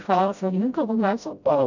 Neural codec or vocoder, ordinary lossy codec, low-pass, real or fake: codec, 16 kHz, 0.5 kbps, FreqCodec, smaller model; none; 7.2 kHz; fake